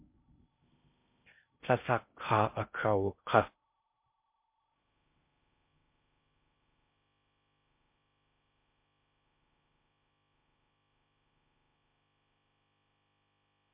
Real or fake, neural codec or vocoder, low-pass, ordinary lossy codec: fake; codec, 16 kHz in and 24 kHz out, 0.6 kbps, FocalCodec, streaming, 4096 codes; 3.6 kHz; MP3, 32 kbps